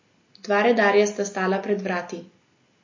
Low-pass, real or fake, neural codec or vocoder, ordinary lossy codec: 7.2 kHz; real; none; MP3, 32 kbps